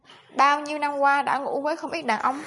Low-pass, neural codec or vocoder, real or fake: 10.8 kHz; none; real